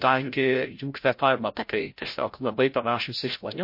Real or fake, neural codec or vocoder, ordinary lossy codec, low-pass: fake; codec, 16 kHz, 0.5 kbps, FreqCodec, larger model; MP3, 32 kbps; 5.4 kHz